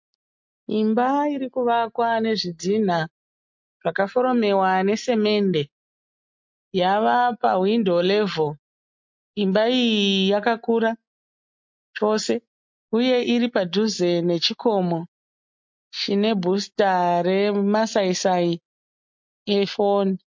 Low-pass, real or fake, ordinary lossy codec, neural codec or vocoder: 7.2 kHz; real; MP3, 48 kbps; none